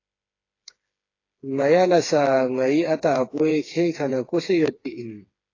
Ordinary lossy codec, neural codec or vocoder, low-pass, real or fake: AAC, 32 kbps; codec, 16 kHz, 4 kbps, FreqCodec, smaller model; 7.2 kHz; fake